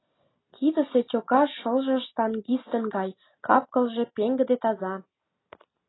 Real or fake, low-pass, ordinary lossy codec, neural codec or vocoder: real; 7.2 kHz; AAC, 16 kbps; none